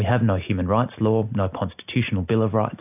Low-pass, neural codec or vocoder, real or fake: 3.6 kHz; none; real